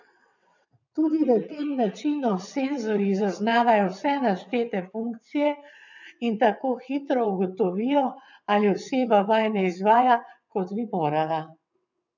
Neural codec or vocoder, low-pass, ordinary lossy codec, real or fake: vocoder, 22.05 kHz, 80 mel bands, WaveNeXt; 7.2 kHz; none; fake